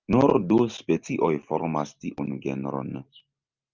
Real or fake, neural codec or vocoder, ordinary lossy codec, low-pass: real; none; Opus, 24 kbps; 7.2 kHz